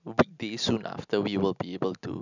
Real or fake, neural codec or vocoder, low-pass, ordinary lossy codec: real; none; 7.2 kHz; none